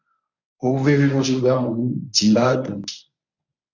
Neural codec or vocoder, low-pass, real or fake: codec, 24 kHz, 0.9 kbps, WavTokenizer, medium speech release version 1; 7.2 kHz; fake